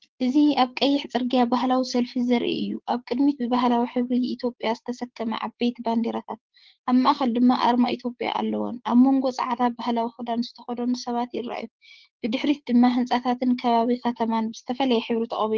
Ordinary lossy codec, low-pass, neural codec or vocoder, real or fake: Opus, 16 kbps; 7.2 kHz; codec, 16 kHz, 6 kbps, DAC; fake